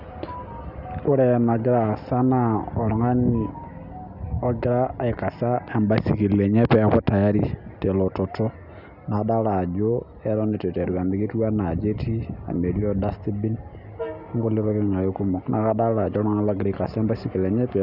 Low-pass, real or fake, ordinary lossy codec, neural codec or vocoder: 5.4 kHz; real; none; none